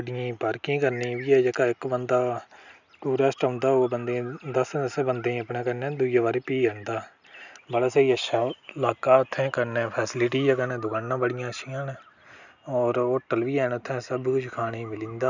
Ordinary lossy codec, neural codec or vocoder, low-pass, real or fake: none; none; 7.2 kHz; real